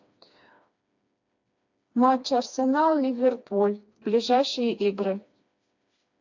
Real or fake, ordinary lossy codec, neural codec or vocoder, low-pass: fake; AAC, 48 kbps; codec, 16 kHz, 2 kbps, FreqCodec, smaller model; 7.2 kHz